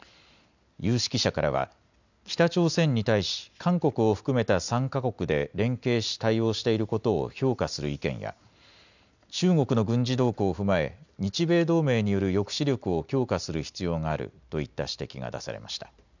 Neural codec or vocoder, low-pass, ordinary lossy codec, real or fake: none; 7.2 kHz; none; real